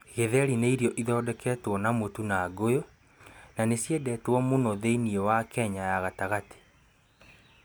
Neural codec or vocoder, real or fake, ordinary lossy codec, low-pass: none; real; none; none